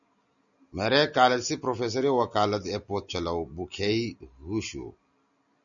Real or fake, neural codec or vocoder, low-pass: real; none; 7.2 kHz